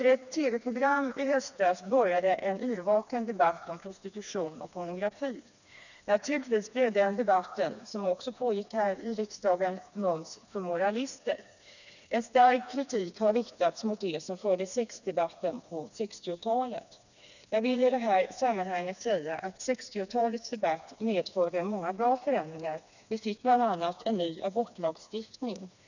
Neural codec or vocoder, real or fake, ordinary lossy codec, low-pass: codec, 16 kHz, 2 kbps, FreqCodec, smaller model; fake; none; 7.2 kHz